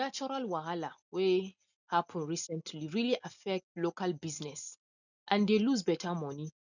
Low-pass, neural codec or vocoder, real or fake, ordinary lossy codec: 7.2 kHz; none; real; none